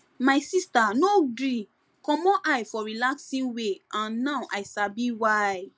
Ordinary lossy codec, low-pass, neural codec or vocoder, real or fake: none; none; none; real